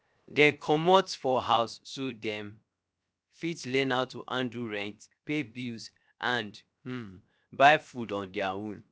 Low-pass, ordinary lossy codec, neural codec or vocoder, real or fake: none; none; codec, 16 kHz, 0.7 kbps, FocalCodec; fake